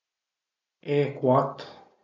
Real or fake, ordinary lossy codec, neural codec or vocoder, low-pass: real; none; none; 7.2 kHz